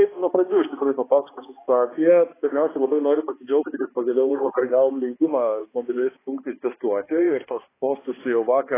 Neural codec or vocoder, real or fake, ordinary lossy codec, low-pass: codec, 16 kHz, 2 kbps, X-Codec, HuBERT features, trained on balanced general audio; fake; AAC, 16 kbps; 3.6 kHz